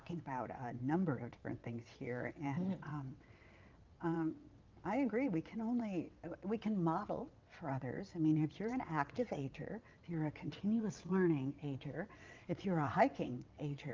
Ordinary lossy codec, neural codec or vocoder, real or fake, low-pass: Opus, 24 kbps; vocoder, 22.05 kHz, 80 mel bands, WaveNeXt; fake; 7.2 kHz